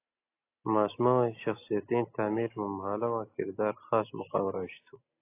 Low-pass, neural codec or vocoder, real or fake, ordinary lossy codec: 3.6 kHz; none; real; AAC, 24 kbps